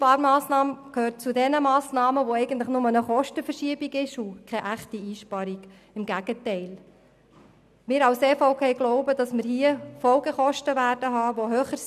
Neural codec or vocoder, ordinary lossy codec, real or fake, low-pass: none; none; real; 14.4 kHz